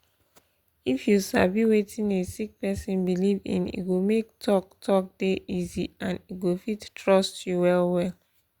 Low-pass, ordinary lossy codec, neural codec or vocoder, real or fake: 19.8 kHz; Opus, 64 kbps; none; real